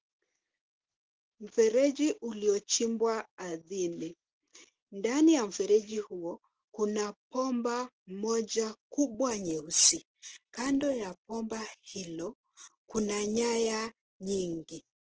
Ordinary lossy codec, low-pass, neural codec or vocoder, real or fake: Opus, 16 kbps; 7.2 kHz; none; real